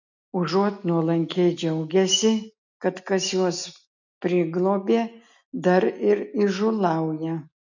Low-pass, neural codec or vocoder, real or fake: 7.2 kHz; none; real